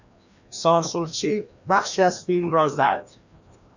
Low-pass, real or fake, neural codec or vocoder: 7.2 kHz; fake; codec, 16 kHz, 1 kbps, FreqCodec, larger model